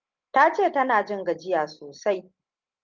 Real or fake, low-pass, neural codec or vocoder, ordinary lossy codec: real; 7.2 kHz; none; Opus, 32 kbps